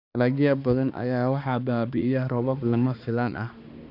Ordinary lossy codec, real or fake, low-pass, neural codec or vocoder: none; fake; 5.4 kHz; codec, 16 kHz, 2 kbps, X-Codec, HuBERT features, trained on balanced general audio